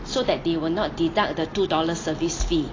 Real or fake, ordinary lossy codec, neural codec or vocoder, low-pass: real; AAC, 32 kbps; none; 7.2 kHz